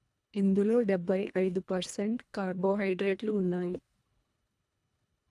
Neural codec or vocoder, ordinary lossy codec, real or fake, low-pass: codec, 24 kHz, 1.5 kbps, HILCodec; none; fake; none